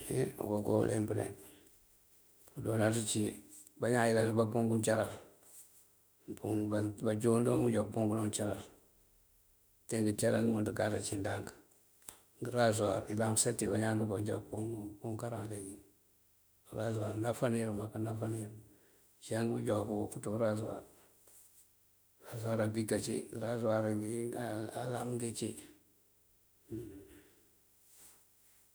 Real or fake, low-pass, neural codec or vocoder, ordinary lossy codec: fake; none; autoencoder, 48 kHz, 32 numbers a frame, DAC-VAE, trained on Japanese speech; none